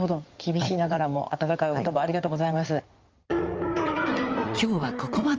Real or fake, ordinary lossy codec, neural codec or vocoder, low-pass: fake; Opus, 24 kbps; codec, 16 kHz in and 24 kHz out, 2.2 kbps, FireRedTTS-2 codec; 7.2 kHz